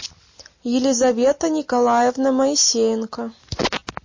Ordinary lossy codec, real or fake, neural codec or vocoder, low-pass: MP3, 32 kbps; real; none; 7.2 kHz